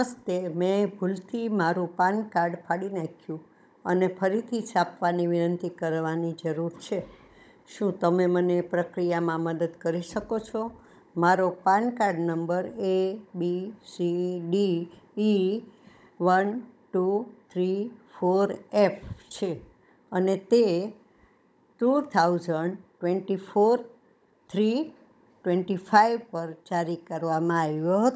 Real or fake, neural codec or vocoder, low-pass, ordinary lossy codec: fake; codec, 16 kHz, 16 kbps, FunCodec, trained on Chinese and English, 50 frames a second; none; none